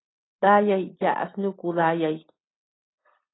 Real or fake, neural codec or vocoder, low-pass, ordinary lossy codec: real; none; 7.2 kHz; AAC, 16 kbps